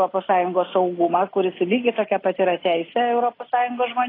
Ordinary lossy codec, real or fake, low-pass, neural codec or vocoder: AAC, 24 kbps; real; 5.4 kHz; none